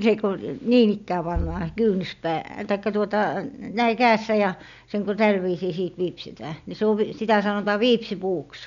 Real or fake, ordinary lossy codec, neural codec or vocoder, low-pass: real; none; none; 7.2 kHz